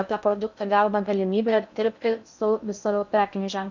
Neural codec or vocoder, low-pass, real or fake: codec, 16 kHz in and 24 kHz out, 0.6 kbps, FocalCodec, streaming, 2048 codes; 7.2 kHz; fake